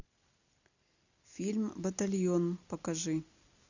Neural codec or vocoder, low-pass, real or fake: none; 7.2 kHz; real